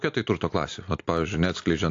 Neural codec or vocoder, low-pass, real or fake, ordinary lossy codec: none; 7.2 kHz; real; AAC, 48 kbps